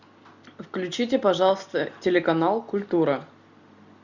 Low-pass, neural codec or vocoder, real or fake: 7.2 kHz; none; real